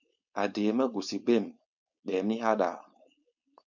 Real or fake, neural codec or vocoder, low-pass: fake; codec, 16 kHz, 4.8 kbps, FACodec; 7.2 kHz